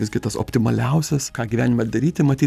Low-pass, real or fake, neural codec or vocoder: 14.4 kHz; real; none